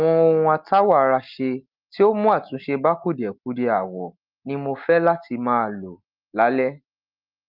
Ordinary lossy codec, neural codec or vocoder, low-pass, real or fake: Opus, 32 kbps; none; 5.4 kHz; real